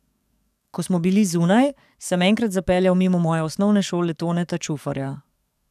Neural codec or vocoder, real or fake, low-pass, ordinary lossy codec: codec, 44.1 kHz, 7.8 kbps, DAC; fake; 14.4 kHz; none